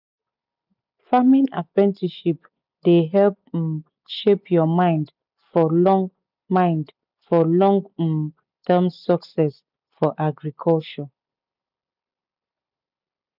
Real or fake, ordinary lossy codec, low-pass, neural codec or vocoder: real; none; 5.4 kHz; none